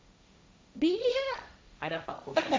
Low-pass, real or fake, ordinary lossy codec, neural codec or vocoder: none; fake; none; codec, 16 kHz, 1.1 kbps, Voila-Tokenizer